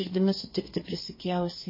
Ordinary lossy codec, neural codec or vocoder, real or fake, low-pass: MP3, 24 kbps; codec, 32 kHz, 1.9 kbps, SNAC; fake; 5.4 kHz